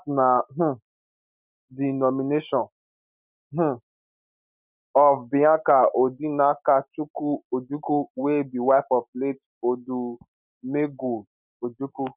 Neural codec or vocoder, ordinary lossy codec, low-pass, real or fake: none; none; 3.6 kHz; real